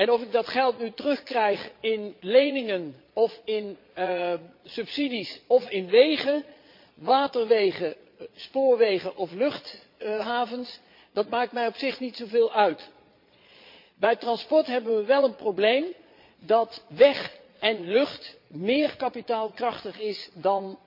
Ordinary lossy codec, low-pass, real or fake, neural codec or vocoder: MP3, 32 kbps; 5.4 kHz; fake; vocoder, 22.05 kHz, 80 mel bands, Vocos